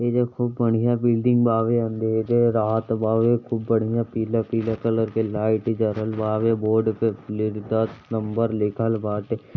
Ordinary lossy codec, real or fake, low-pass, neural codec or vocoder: none; real; 7.2 kHz; none